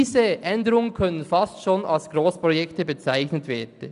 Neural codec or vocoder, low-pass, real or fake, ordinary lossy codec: none; 10.8 kHz; real; none